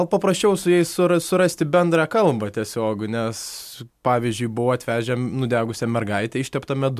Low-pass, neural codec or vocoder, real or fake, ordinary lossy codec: 14.4 kHz; none; real; MP3, 96 kbps